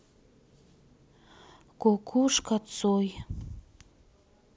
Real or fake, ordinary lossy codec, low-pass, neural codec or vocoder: real; none; none; none